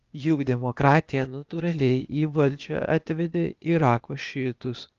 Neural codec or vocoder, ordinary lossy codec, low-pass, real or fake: codec, 16 kHz, 0.8 kbps, ZipCodec; Opus, 32 kbps; 7.2 kHz; fake